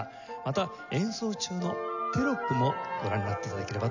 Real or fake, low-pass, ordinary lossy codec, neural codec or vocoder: real; 7.2 kHz; none; none